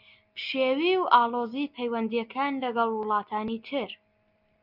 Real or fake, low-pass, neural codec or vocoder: real; 5.4 kHz; none